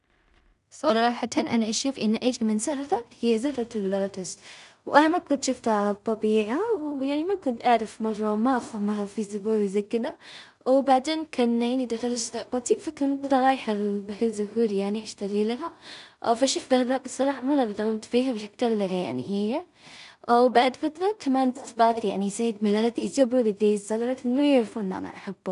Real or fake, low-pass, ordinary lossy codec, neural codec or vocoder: fake; 10.8 kHz; none; codec, 16 kHz in and 24 kHz out, 0.4 kbps, LongCat-Audio-Codec, two codebook decoder